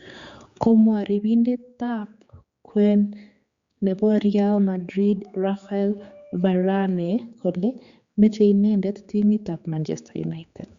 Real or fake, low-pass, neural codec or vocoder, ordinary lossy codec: fake; 7.2 kHz; codec, 16 kHz, 4 kbps, X-Codec, HuBERT features, trained on general audio; Opus, 64 kbps